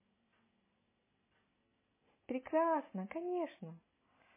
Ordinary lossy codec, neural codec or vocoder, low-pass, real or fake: MP3, 16 kbps; none; 3.6 kHz; real